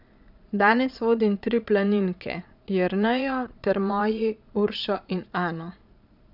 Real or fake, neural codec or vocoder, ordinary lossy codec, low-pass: fake; vocoder, 22.05 kHz, 80 mel bands, Vocos; none; 5.4 kHz